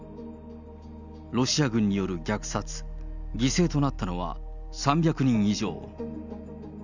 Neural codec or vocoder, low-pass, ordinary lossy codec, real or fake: none; 7.2 kHz; none; real